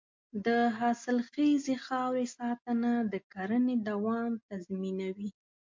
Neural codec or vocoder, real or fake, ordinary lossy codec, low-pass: none; real; MP3, 48 kbps; 7.2 kHz